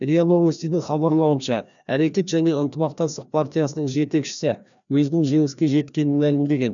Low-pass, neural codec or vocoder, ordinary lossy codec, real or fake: 7.2 kHz; codec, 16 kHz, 1 kbps, FreqCodec, larger model; none; fake